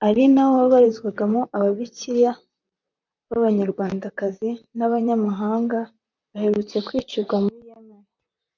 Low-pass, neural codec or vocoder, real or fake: 7.2 kHz; vocoder, 44.1 kHz, 128 mel bands, Pupu-Vocoder; fake